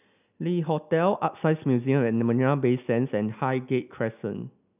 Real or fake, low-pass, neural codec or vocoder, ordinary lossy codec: real; 3.6 kHz; none; none